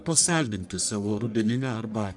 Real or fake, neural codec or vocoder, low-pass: fake; codec, 44.1 kHz, 1.7 kbps, Pupu-Codec; 10.8 kHz